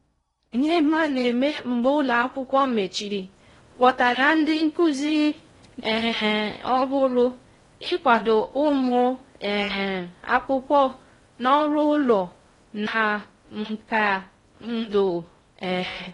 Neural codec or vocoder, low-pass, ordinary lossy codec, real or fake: codec, 16 kHz in and 24 kHz out, 0.6 kbps, FocalCodec, streaming, 4096 codes; 10.8 kHz; AAC, 32 kbps; fake